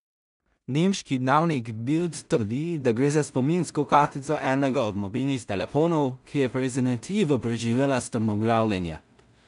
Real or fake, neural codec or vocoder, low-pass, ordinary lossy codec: fake; codec, 16 kHz in and 24 kHz out, 0.4 kbps, LongCat-Audio-Codec, two codebook decoder; 10.8 kHz; none